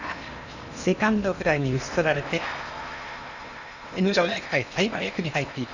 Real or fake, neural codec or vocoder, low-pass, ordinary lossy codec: fake; codec, 16 kHz in and 24 kHz out, 0.8 kbps, FocalCodec, streaming, 65536 codes; 7.2 kHz; none